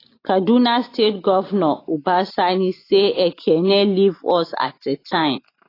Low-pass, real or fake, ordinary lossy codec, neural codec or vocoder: 5.4 kHz; real; AAC, 24 kbps; none